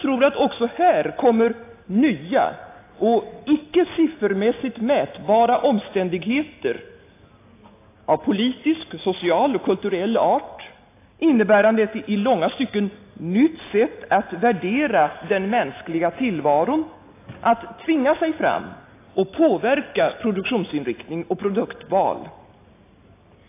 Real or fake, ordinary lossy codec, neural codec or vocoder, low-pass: real; AAC, 24 kbps; none; 3.6 kHz